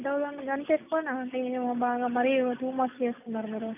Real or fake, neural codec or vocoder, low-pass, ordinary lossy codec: real; none; 3.6 kHz; none